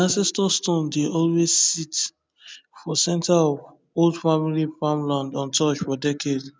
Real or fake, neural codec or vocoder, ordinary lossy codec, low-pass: real; none; none; none